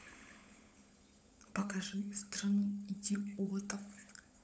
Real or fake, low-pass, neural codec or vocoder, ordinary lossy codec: fake; none; codec, 16 kHz, 16 kbps, FunCodec, trained on LibriTTS, 50 frames a second; none